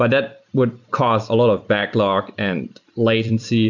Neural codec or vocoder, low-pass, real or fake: vocoder, 44.1 kHz, 128 mel bands every 256 samples, BigVGAN v2; 7.2 kHz; fake